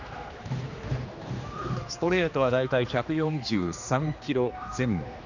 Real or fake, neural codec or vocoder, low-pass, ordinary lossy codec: fake; codec, 16 kHz, 2 kbps, X-Codec, HuBERT features, trained on general audio; 7.2 kHz; none